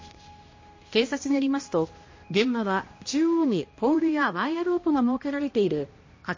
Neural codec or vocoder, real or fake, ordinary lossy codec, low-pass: codec, 16 kHz, 1 kbps, X-Codec, HuBERT features, trained on balanced general audio; fake; MP3, 32 kbps; 7.2 kHz